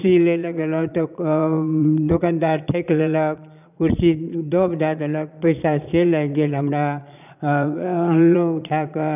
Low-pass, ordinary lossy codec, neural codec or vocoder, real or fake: 3.6 kHz; none; vocoder, 22.05 kHz, 80 mel bands, Vocos; fake